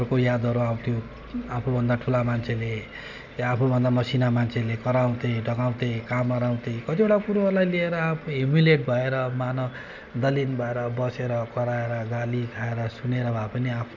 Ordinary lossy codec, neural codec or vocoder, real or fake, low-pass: none; none; real; 7.2 kHz